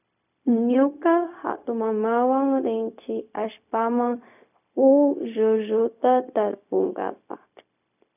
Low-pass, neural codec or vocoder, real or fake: 3.6 kHz; codec, 16 kHz, 0.4 kbps, LongCat-Audio-Codec; fake